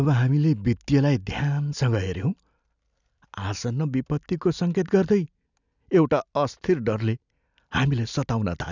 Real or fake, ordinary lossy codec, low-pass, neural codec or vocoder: real; none; 7.2 kHz; none